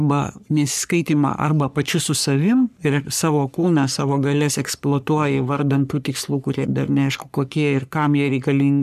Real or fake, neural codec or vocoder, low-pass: fake; codec, 44.1 kHz, 3.4 kbps, Pupu-Codec; 14.4 kHz